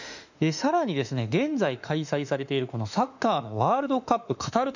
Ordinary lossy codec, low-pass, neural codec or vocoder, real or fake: none; 7.2 kHz; autoencoder, 48 kHz, 32 numbers a frame, DAC-VAE, trained on Japanese speech; fake